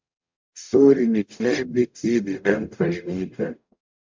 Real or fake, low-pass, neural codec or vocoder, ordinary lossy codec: fake; 7.2 kHz; codec, 44.1 kHz, 0.9 kbps, DAC; MP3, 64 kbps